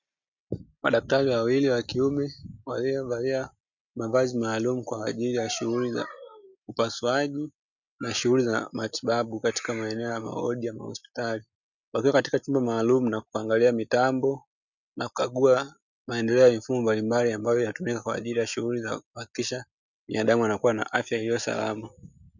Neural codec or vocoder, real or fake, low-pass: none; real; 7.2 kHz